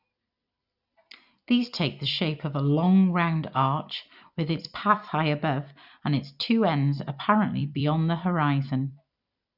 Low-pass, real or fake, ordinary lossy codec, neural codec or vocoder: 5.4 kHz; fake; none; vocoder, 44.1 kHz, 128 mel bands every 256 samples, BigVGAN v2